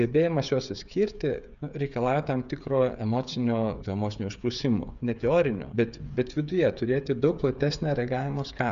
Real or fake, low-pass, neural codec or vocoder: fake; 7.2 kHz; codec, 16 kHz, 8 kbps, FreqCodec, smaller model